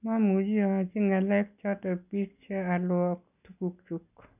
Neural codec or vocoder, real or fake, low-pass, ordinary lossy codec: none; real; 3.6 kHz; none